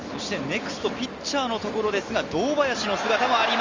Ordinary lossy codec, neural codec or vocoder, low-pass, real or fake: Opus, 32 kbps; none; 7.2 kHz; real